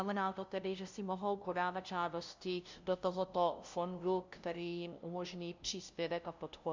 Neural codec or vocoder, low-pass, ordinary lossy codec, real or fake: codec, 16 kHz, 0.5 kbps, FunCodec, trained on LibriTTS, 25 frames a second; 7.2 kHz; MP3, 64 kbps; fake